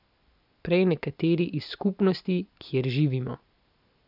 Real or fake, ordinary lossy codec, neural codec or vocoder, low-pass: real; none; none; 5.4 kHz